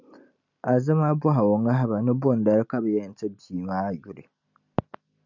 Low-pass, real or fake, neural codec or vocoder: 7.2 kHz; real; none